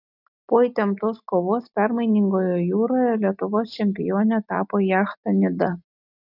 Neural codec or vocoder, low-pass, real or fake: none; 5.4 kHz; real